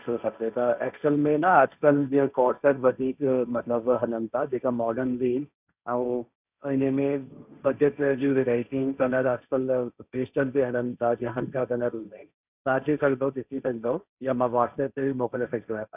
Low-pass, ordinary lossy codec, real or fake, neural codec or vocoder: 3.6 kHz; none; fake; codec, 16 kHz, 1.1 kbps, Voila-Tokenizer